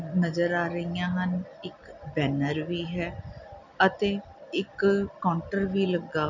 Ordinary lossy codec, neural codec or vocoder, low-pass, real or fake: none; none; 7.2 kHz; real